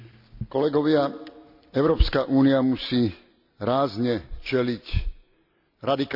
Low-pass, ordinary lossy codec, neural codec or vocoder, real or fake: 5.4 kHz; AAC, 48 kbps; none; real